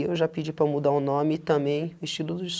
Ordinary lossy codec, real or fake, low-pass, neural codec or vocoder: none; real; none; none